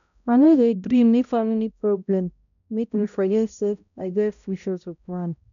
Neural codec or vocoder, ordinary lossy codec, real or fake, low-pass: codec, 16 kHz, 0.5 kbps, X-Codec, HuBERT features, trained on balanced general audio; none; fake; 7.2 kHz